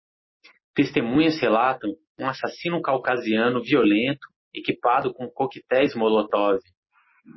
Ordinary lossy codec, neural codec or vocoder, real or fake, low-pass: MP3, 24 kbps; none; real; 7.2 kHz